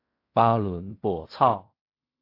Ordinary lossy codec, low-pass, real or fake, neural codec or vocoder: AAC, 32 kbps; 5.4 kHz; fake; codec, 16 kHz in and 24 kHz out, 0.4 kbps, LongCat-Audio-Codec, fine tuned four codebook decoder